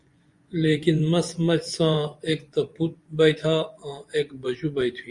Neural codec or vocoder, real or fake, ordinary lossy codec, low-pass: vocoder, 24 kHz, 100 mel bands, Vocos; fake; Opus, 64 kbps; 10.8 kHz